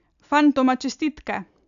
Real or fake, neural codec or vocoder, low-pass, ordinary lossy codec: real; none; 7.2 kHz; AAC, 96 kbps